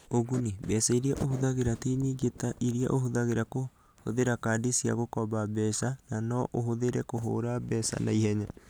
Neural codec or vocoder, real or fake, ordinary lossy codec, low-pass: none; real; none; none